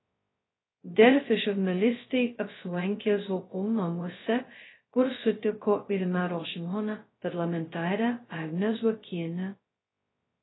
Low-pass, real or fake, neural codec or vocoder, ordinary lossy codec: 7.2 kHz; fake; codec, 16 kHz, 0.2 kbps, FocalCodec; AAC, 16 kbps